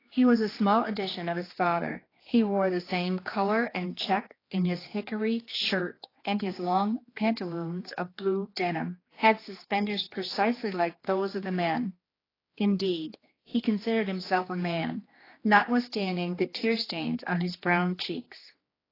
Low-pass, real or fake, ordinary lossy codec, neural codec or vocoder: 5.4 kHz; fake; AAC, 24 kbps; codec, 16 kHz, 2 kbps, X-Codec, HuBERT features, trained on general audio